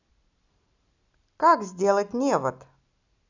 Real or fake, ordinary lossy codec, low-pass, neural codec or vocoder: real; none; 7.2 kHz; none